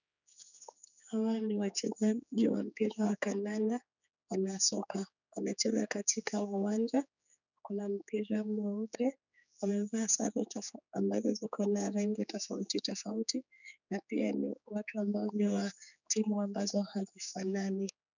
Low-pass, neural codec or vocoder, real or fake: 7.2 kHz; codec, 16 kHz, 4 kbps, X-Codec, HuBERT features, trained on general audio; fake